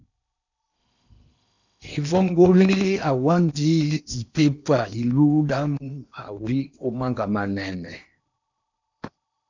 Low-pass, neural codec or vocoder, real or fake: 7.2 kHz; codec, 16 kHz in and 24 kHz out, 0.8 kbps, FocalCodec, streaming, 65536 codes; fake